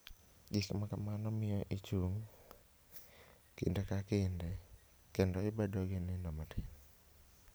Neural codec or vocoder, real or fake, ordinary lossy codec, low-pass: none; real; none; none